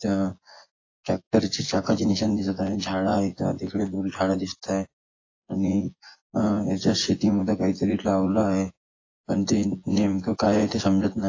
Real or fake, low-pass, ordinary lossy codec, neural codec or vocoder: fake; 7.2 kHz; AAC, 32 kbps; vocoder, 24 kHz, 100 mel bands, Vocos